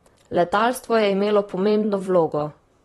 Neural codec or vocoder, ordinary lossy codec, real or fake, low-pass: vocoder, 44.1 kHz, 128 mel bands, Pupu-Vocoder; AAC, 32 kbps; fake; 19.8 kHz